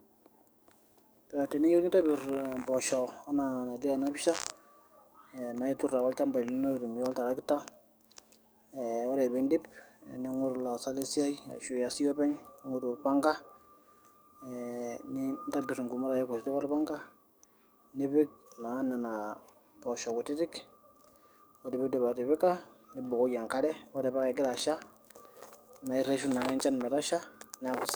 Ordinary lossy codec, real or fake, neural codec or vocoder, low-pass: none; fake; codec, 44.1 kHz, 7.8 kbps, DAC; none